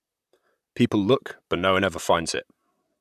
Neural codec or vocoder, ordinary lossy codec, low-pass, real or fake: vocoder, 44.1 kHz, 128 mel bands, Pupu-Vocoder; none; 14.4 kHz; fake